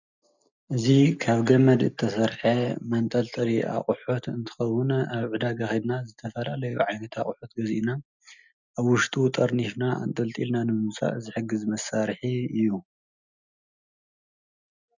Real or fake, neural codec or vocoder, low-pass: real; none; 7.2 kHz